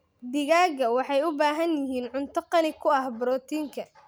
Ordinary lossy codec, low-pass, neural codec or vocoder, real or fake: none; none; none; real